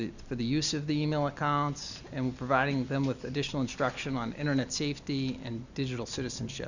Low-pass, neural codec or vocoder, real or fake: 7.2 kHz; none; real